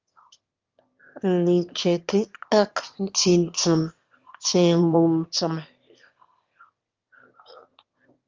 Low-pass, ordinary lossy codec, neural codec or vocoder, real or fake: 7.2 kHz; Opus, 32 kbps; autoencoder, 22.05 kHz, a latent of 192 numbers a frame, VITS, trained on one speaker; fake